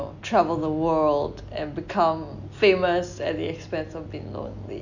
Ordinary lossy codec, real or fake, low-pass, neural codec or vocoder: none; real; 7.2 kHz; none